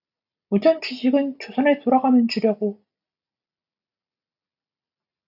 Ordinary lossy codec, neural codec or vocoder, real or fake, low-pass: AAC, 48 kbps; none; real; 5.4 kHz